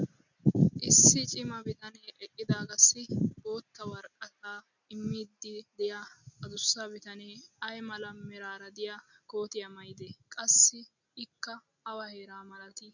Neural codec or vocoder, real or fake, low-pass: none; real; 7.2 kHz